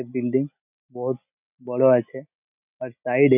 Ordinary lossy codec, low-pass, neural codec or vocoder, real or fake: none; 3.6 kHz; none; real